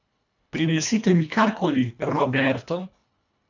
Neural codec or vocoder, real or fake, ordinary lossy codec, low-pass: codec, 24 kHz, 1.5 kbps, HILCodec; fake; none; 7.2 kHz